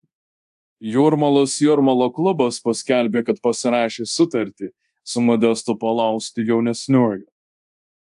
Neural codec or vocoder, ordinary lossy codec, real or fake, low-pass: codec, 24 kHz, 0.9 kbps, DualCodec; AAC, 96 kbps; fake; 10.8 kHz